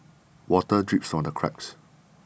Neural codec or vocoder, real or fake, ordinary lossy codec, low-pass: none; real; none; none